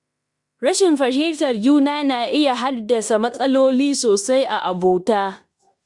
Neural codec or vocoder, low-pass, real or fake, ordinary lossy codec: codec, 16 kHz in and 24 kHz out, 0.9 kbps, LongCat-Audio-Codec, fine tuned four codebook decoder; 10.8 kHz; fake; Opus, 64 kbps